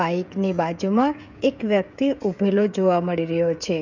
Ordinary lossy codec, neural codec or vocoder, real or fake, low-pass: none; codec, 16 kHz, 16 kbps, FreqCodec, smaller model; fake; 7.2 kHz